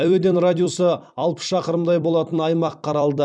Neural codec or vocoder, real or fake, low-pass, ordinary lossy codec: vocoder, 44.1 kHz, 128 mel bands every 256 samples, BigVGAN v2; fake; 9.9 kHz; none